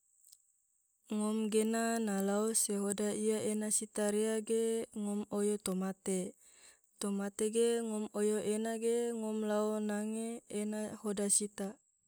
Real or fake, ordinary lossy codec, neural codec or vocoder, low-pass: real; none; none; none